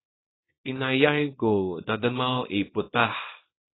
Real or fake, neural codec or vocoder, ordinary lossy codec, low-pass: fake; codec, 24 kHz, 0.9 kbps, WavTokenizer, small release; AAC, 16 kbps; 7.2 kHz